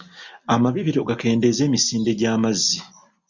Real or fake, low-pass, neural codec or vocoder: real; 7.2 kHz; none